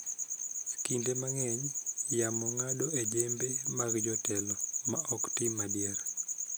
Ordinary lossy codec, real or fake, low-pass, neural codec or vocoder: none; real; none; none